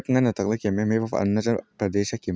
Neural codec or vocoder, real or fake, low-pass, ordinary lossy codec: none; real; none; none